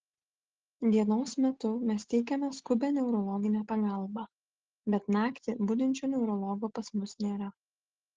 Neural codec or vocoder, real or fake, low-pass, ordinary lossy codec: none; real; 7.2 kHz; Opus, 16 kbps